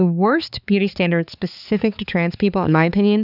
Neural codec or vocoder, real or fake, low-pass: codec, 16 kHz, 4 kbps, X-Codec, HuBERT features, trained on balanced general audio; fake; 5.4 kHz